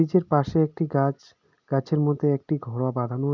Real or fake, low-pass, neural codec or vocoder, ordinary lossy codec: real; 7.2 kHz; none; none